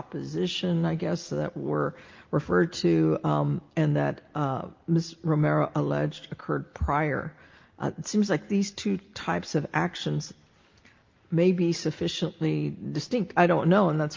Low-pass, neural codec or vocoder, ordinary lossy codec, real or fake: 7.2 kHz; none; Opus, 32 kbps; real